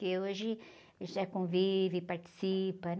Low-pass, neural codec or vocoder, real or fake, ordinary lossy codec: none; none; real; none